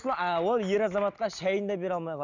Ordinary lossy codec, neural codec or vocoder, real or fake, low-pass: none; none; real; 7.2 kHz